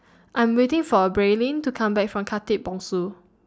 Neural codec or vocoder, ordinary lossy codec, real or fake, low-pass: none; none; real; none